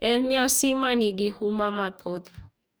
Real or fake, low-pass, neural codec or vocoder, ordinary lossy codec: fake; none; codec, 44.1 kHz, 2.6 kbps, DAC; none